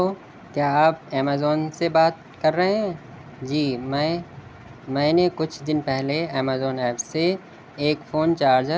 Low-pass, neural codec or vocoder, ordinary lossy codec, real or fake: none; none; none; real